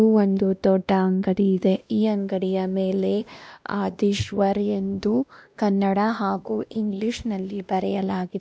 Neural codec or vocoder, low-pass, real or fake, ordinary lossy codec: codec, 16 kHz, 1 kbps, X-Codec, WavLM features, trained on Multilingual LibriSpeech; none; fake; none